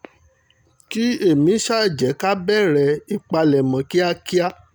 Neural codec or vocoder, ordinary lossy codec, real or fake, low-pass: none; none; real; none